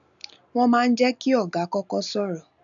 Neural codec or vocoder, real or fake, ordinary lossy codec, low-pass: none; real; MP3, 96 kbps; 7.2 kHz